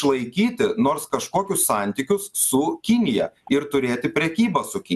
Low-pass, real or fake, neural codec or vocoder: 14.4 kHz; real; none